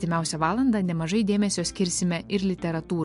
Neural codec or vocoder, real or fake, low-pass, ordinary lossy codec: none; real; 10.8 kHz; MP3, 64 kbps